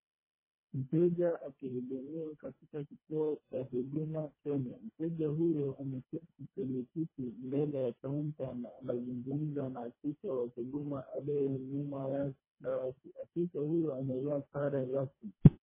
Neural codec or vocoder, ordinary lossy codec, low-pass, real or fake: codec, 24 kHz, 1.5 kbps, HILCodec; MP3, 16 kbps; 3.6 kHz; fake